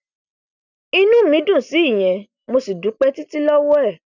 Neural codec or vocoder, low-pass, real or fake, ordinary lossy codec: none; 7.2 kHz; real; none